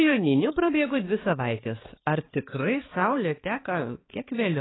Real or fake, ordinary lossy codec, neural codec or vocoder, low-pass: fake; AAC, 16 kbps; codec, 16 kHz, 4 kbps, X-Codec, HuBERT features, trained on balanced general audio; 7.2 kHz